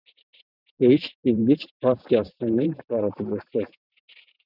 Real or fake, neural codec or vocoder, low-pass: fake; vocoder, 44.1 kHz, 128 mel bands every 512 samples, BigVGAN v2; 5.4 kHz